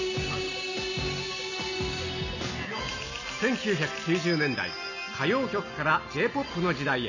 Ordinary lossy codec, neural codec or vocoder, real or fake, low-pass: none; none; real; 7.2 kHz